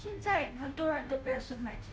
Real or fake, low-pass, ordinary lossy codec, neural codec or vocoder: fake; none; none; codec, 16 kHz, 0.5 kbps, FunCodec, trained on Chinese and English, 25 frames a second